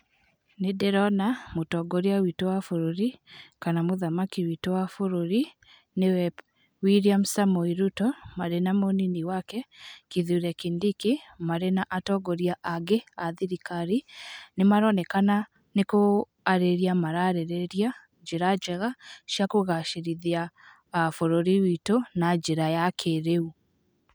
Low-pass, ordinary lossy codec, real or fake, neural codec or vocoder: none; none; real; none